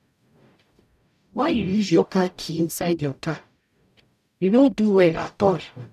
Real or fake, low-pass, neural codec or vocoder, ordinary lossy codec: fake; 14.4 kHz; codec, 44.1 kHz, 0.9 kbps, DAC; none